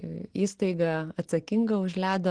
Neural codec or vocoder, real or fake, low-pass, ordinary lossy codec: none; real; 9.9 kHz; Opus, 16 kbps